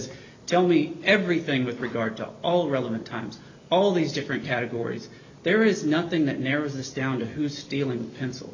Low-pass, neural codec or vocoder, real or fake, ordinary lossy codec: 7.2 kHz; none; real; AAC, 48 kbps